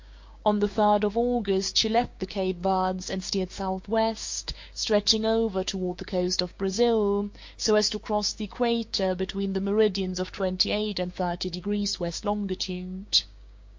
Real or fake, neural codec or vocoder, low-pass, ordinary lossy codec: fake; codec, 16 kHz, 6 kbps, DAC; 7.2 kHz; MP3, 48 kbps